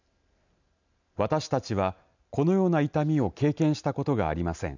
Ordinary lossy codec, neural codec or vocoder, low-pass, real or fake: none; none; 7.2 kHz; real